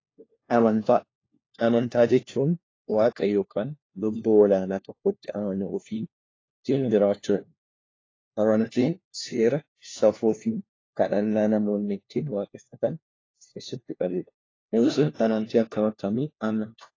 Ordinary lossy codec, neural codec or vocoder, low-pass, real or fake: AAC, 32 kbps; codec, 16 kHz, 1 kbps, FunCodec, trained on LibriTTS, 50 frames a second; 7.2 kHz; fake